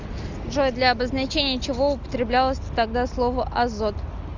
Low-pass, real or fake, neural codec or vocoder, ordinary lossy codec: 7.2 kHz; real; none; Opus, 64 kbps